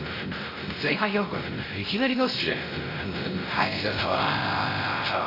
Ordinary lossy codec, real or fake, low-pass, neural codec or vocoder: AAC, 24 kbps; fake; 5.4 kHz; codec, 16 kHz, 0.5 kbps, X-Codec, WavLM features, trained on Multilingual LibriSpeech